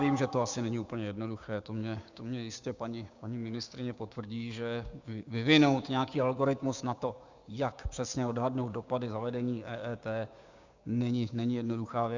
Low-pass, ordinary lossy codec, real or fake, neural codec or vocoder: 7.2 kHz; Opus, 64 kbps; fake; codec, 16 kHz, 6 kbps, DAC